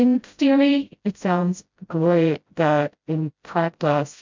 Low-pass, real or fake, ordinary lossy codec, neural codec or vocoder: 7.2 kHz; fake; MP3, 64 kbps; codec, 16 kHz, 0.5 kbps, FreqCodec, smaller model